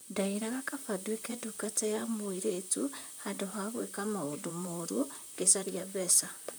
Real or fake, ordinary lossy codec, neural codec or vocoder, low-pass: fake; none; vocoder, 44.1 kHz, 128 mel bands, Pupu-Vocoder; none